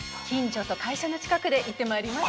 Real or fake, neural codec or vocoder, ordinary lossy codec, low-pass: real; none; none; none